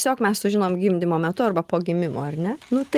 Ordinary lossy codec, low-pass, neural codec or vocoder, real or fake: Opus, 24 kbps; 14.4 kHz; none; real